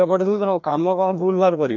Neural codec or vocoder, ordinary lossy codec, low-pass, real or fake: codec, 16 kHz, 1 kbps, FreqCodec, larger model; none; 7.2 kHz; fake